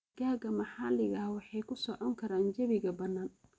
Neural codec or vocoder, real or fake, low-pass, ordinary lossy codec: none; real; none; none